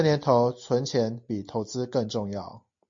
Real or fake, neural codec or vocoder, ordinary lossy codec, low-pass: real; none; MP3, 48 kbps; 7.2 kHz